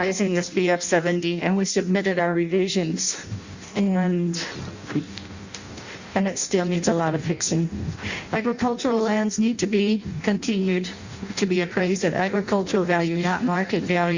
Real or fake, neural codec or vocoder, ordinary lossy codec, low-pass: fake; codec, 16 kHz in and 24 kHz out, 0.6 kbps, FireRedTTS-2 codec; Opus, 64 kbps; 7.2 kHz